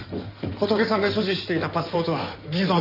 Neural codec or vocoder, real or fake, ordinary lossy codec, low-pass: codec, 16 kHz in and 24 kHz out, 2.2 kbps, FireRedTTS-2 codec; fake; none; 5.4 kHz